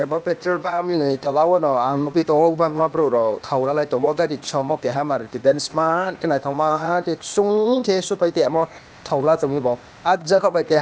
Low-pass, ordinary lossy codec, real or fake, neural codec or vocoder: none; none; fake; codec, 16 kHz, 0.8 kbps, ZipCodec